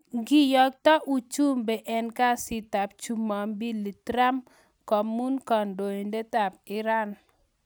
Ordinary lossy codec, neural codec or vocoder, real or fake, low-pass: none; none; real; none